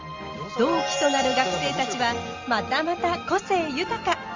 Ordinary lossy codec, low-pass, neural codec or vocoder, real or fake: Opus, 32 kbps; 7.2 kHz; none; real